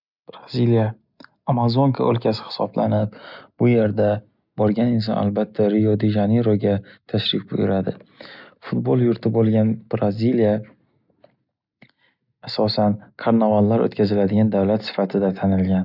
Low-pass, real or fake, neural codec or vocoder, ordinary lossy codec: 5.4 kHz; real; none; none